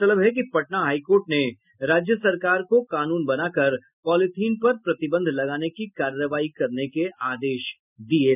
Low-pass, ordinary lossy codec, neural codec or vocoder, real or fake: 3.6 kHz; none; none; real